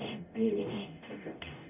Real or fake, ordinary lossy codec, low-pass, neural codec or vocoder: fake; none; 3.6 kHz; codec, 44.1 kHz, 0.9 kbps, DAC